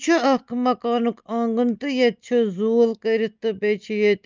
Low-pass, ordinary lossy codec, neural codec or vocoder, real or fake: 7.2 kHz; Opus, 24 kbps; none; real